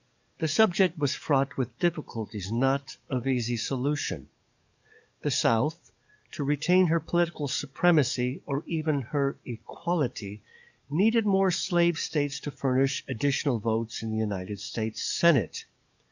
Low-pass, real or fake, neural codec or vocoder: 7.2 kHz; fake; codec, 44.1 kHz, 7.8 kbps, DAC